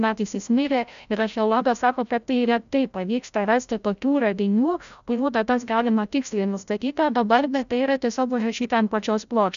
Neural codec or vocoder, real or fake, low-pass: codec, 16 kHz, 0.5 kbps, FreqCodec, larger model; fake; 7.2 kHz